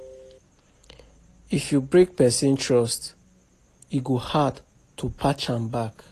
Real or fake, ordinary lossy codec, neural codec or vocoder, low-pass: real; AAC, 48 kbps; none; 14.4 kHz